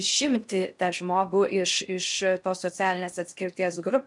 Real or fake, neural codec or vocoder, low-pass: fake; codec, 16 kHz in and 24 kHz out, 0.6 kbps, FocalCodec, streaming, 4096 codes; 10.8 kHz